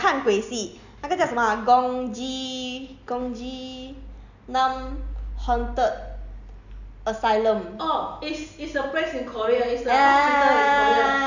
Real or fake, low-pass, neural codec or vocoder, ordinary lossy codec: real; 7.2 kHz; none; none